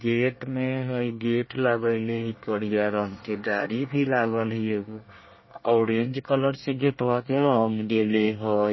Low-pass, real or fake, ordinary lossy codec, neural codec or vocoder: 7.2 kHz; fake; MP3, 24 kbps; codec, 24 kHz, 1 kbps, SNAC